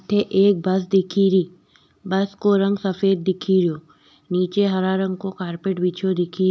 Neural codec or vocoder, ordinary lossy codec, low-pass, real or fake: none; none; none; real